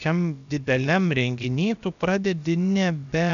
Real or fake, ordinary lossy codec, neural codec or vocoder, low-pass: fake; MP3, 96 kbps; codec, 16 kHz, about 1 kbps, DyCAST, with the encoder's durations; 7.2 kHz